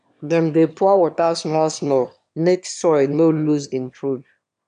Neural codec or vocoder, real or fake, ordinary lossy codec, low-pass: autoencoder, 22.05 kHz, a latent of 192 numbers a frame, VITS, trained on one speaker; fake; MP3, 96 kbps; 9.9 kHz